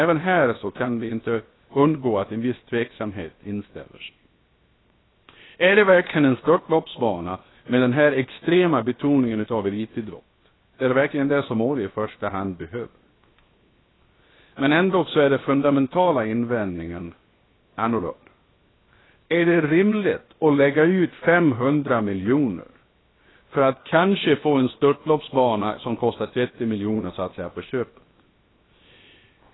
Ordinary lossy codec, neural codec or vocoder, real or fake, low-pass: AAC, 16 kbps; codec, 16 kHz, 0.7 kbps, FocalCodec; fake; 7.2 kHz